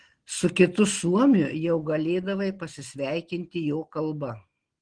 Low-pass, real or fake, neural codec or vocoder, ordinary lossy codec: 9.9 kHz; real; none; Opus, 16 kbps